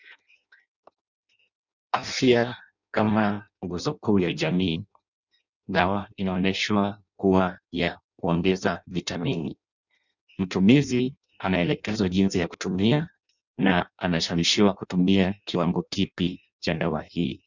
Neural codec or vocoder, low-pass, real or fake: codec, 16 kHz in and 24 kHz out, 0.6 kbps, FireRedTTS-2 codec; 7.2 kHz; fake